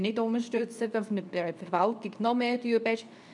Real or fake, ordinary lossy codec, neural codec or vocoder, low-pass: fake; none; codec, 24 kHz, 0.9 kbps, WavTokenizer, medium speech release version 2; 10.8 kHz